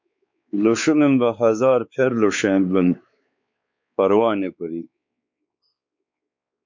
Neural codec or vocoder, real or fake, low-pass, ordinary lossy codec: codec, 16 kHz, 4 kbps, X-Codec, WavLM features, trained on Multilingual LibriSpeech; fake; 7.2 kHz; MP3, 64 kbps